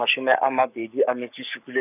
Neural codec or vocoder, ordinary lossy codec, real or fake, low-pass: codec, 44.1 kHz, 7.8 kbps, Pupu-Codec; none; fake; 3.6 kHz